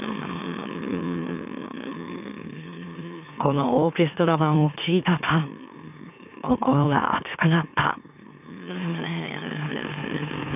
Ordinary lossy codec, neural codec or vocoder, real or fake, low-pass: none; autoencoder, 44.1 kHz, a latent of 192 numbers a frame, MeloTTS; fake; 3.6 kHz